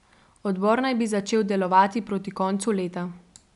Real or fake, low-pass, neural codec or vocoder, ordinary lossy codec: real; 10.8 kHz; none; none